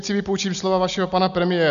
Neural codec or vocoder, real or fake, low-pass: none; real; 7.2 kHz